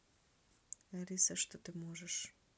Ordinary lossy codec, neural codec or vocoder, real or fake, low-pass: none; none; real; none